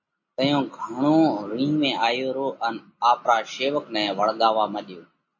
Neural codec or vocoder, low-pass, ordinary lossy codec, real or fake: none; 7.2 kHz; MP3, 32 kbps; real